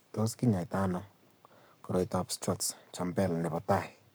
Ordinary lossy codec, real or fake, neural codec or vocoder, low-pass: none; fake; codec, 44.1 kHz, 7.8 kbps, Pupu-Codec; none